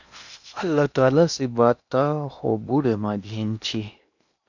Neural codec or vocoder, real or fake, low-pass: codec, 16 kHz in and 24 kHz out, 0.8 kbps, FocalCodec, streaming, 65536 codes; fake; 7.2 kHz